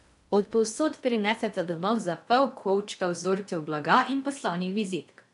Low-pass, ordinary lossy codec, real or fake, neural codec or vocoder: 10.8 kHz; none; fake; codec, 16 kHz in and 24 kHz out, 0.6 kbps, FocalCodec, streaming, 2048 codes